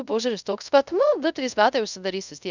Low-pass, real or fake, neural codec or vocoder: 7.2 kHz; fake; codec, 24 kHz, 0.5 kbps, DualCodec